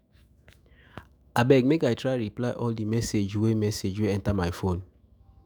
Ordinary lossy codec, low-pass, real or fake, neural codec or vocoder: none; none; fake; autoencoder, 48 kHz, 128 numbers a frame, DAC-VAE, trained on Japanese speech